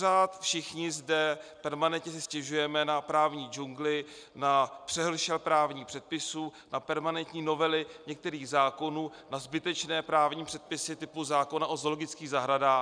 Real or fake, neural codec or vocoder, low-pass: real; none; 9.9 kHz